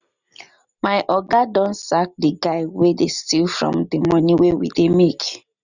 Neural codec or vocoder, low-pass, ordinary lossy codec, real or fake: none; 7.2 kHz; none; real